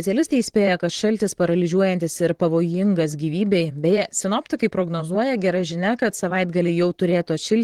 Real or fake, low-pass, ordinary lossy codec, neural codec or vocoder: fake; 19.8 kHz; Opus, 16 kbps; vocoder, 44.1 kHz, 128 mel bands, Pupu-Vocoder